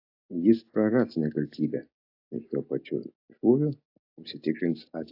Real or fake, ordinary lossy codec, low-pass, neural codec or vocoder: real; AAC, 48 kbps; 5.4 kHz; none